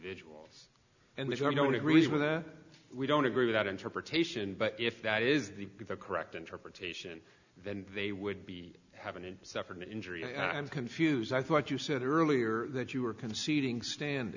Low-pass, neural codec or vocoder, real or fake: 7.2 kHz; none; real